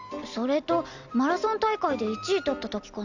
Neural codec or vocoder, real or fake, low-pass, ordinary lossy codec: none; real; 7.2 kHz; none